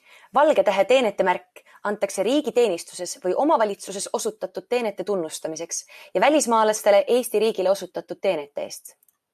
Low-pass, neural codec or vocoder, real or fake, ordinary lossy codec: 14.4 kHz; none; real; AAC, 96 kbps